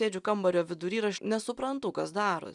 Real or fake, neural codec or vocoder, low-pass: real; none; 10.8 kHz